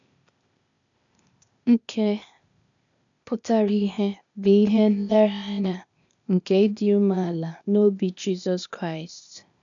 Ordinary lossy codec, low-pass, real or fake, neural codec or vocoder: none; 7.2 kHz; fake; codec, 16 kHz, 0.8 kbps, ZipCodec